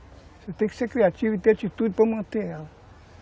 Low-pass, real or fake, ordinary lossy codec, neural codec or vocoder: none; real; none; none